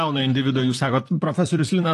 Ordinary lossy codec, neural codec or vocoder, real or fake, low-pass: AAC, 48 kbps; vocoder, 44.1 kHz, 128 mel bands, Pupu-Vocoder; fake; 14.4 kHz